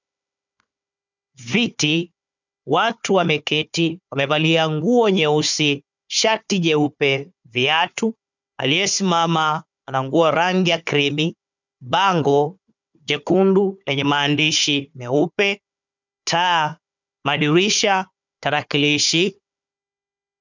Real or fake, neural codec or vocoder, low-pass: fake; codec, 16 kHz, 4 kbps, FunCodec, trained on Chinese and English, 50 frames a second; 7.2 kHz